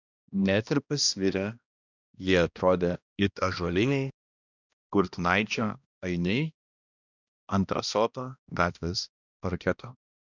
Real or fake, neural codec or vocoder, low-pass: fake; codec, 16 kHz, 1 kbps, X-Codec, HuBERT features, trained on balanced general audio; 7.2 kHz